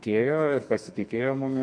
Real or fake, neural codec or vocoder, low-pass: fake; codec, 44.1 kHz, 2.6 kbps, SNAC; 9.9 kHz